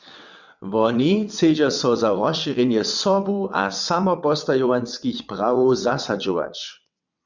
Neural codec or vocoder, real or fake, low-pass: vocoder, 22.05 kHz, 80 mel bands, WaveNeXt; fake; 7.2 kHz